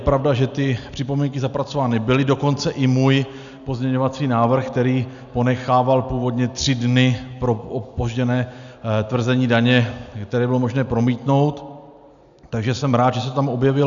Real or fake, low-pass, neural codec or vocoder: real; 7.2 kHz; none